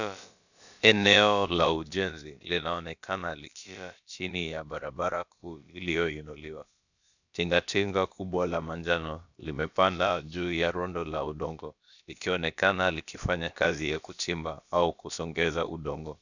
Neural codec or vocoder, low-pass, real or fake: codec, 16 kHz, about 1 kbps, DyCAST, with the encoder's durations; 7.2 kHz; fake